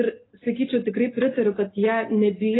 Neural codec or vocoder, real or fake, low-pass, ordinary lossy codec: none; real; 7.2 kHz; AAC, 16 kbps